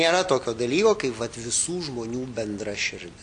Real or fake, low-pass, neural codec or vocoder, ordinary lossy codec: real; 9.9 kHz; none; MP3, 64 kbps